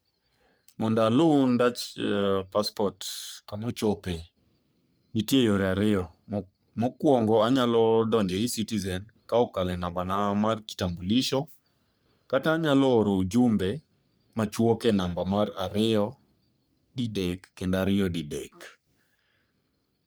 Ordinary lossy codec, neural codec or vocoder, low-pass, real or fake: none; codec, 44.1 kHz, 3.4 kbps, Pupu-Codec; none; fake